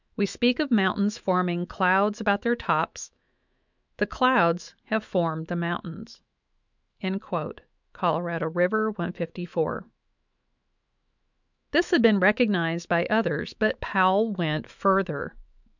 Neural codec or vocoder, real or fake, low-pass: autoencoder, 48 kHz, 128 numbers a frame, DAC-VAE, trained on Japanese speech; fake; 7.2 kHz